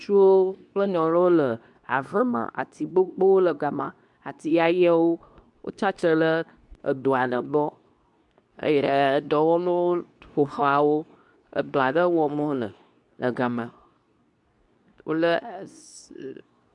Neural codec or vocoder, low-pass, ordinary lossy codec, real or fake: codec, 24 kHz, 0.9 kbps, WavTokenizer, medium speech release version 2; 10.8 kHz; MP3, 96 kbps; fake